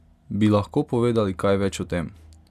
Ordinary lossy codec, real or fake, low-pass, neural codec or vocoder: none; real; 14.4 kHz; none